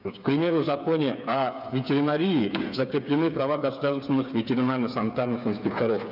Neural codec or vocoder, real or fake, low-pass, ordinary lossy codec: codec, 16 kHz, 8 kbps, FreqCodec, smaller model; fake; 5.4 kHz; none